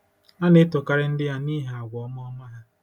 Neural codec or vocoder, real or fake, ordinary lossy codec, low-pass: none; real; none; 19.8 kHz